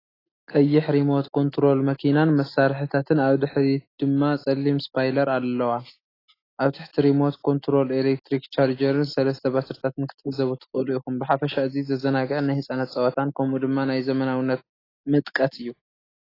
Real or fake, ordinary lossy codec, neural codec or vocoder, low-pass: real; AAC, 24 kbps; none; 5.4 kHz